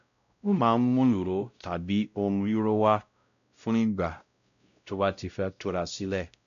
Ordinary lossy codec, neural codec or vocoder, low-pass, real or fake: MP3, 96 kbps; codec, 16 kHz, 0.5 kbps, X-Codec, WavLM features, trained on Multilingual LibriSpeech; 7.2 kHz; fake